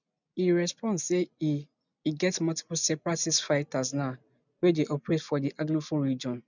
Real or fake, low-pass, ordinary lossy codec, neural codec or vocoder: real; 7.2 kHz; none; none